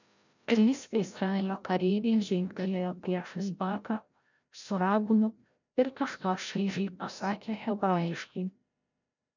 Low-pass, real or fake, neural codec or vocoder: 7.2 kHz; fake; codec, 16 kHz, 0.5 kbps, FreqCodec, larger model